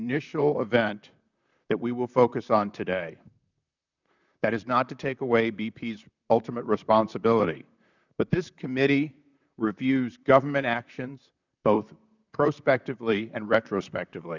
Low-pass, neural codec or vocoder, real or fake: 7.2 kHz; vocoder, 44.1 kHz, 128 mel bands, Pupu-Vocoder; fake